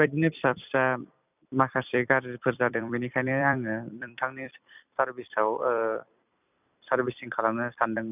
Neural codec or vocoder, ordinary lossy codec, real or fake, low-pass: vocoder, 44.1 kHz, 128 mel bands every 256 samples, BigVGAN v2; none; fake; 3.6 kHz